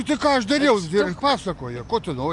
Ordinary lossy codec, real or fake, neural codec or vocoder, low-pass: AAC, 64 kbps; real; none; 10.8 kHz